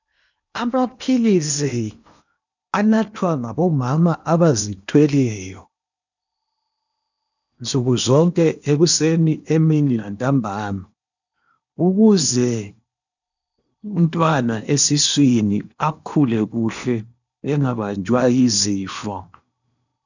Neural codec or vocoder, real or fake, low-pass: codec, 16 kHz in and 24 kHz out, 0.8 kbps, FocalCodec, streaming, 65536 codes; fake; 7.2 kHz